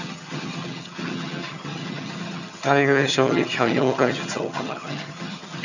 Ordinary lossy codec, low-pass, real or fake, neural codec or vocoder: none; 7.2 kHz; fake; vocoder, 22.05 kHz, 80 mel bands, HiFi-GAN